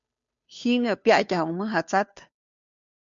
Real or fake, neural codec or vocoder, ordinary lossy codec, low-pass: fake; codec, 16 kHz, 2 kbps, FunCodec, trained on Chinese and English, 25 frames a second; MP3, 64 kbps; 7.2 kHz